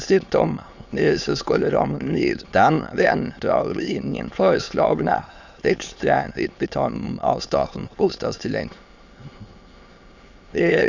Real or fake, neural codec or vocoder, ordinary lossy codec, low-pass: fake; autoencoder, 22.05 kHz, a latent of 192 numbers a frame, VITS, trained on many speakers; Opus, 64 kbps; 7.2 kHz